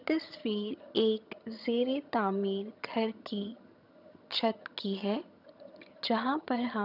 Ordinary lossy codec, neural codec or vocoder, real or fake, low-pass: none; vocoder, 22.05 kHz, 80 mel bands, HiFi-GAN; fake; 5.4 kHz